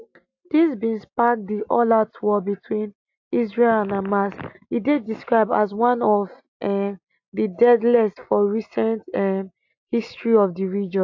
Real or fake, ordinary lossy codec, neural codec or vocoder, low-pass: real; AAC, 48 kbps; none; 7.2 kHz